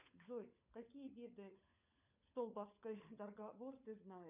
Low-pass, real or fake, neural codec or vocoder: 3.6 kHz; fake; codec, 16 kHz, 16 kbps, FunCodec, trained on LibriTTS, 50 frames a second